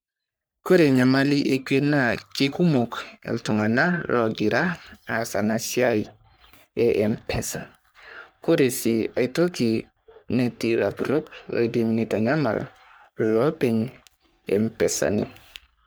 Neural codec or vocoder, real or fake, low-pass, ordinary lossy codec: codec, 44.1 kHz, 3.4 kbps, Pupu-Codec; fake; none; none